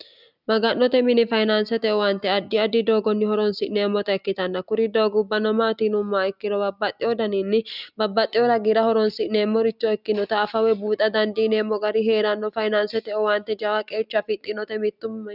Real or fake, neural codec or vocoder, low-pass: real; none; 5.4 kHz